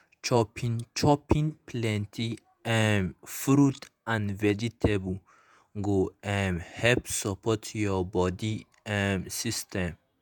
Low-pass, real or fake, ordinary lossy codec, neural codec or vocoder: none; fake; none; vocoder, 48 kHz, 128 mel bands, Vocos